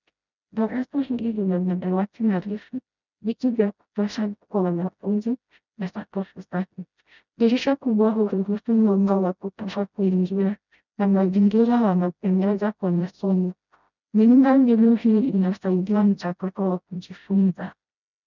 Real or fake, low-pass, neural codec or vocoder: fake; 7.2 kHz; codec, 16 kHz, 0.5 kbps, FreqCodec, smaller model